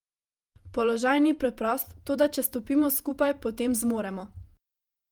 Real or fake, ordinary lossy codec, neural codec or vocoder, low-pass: fake; Opus, 32 kbps; vocoder, 48 kHz, 128 mel bands, Vocos; 19.8 kHz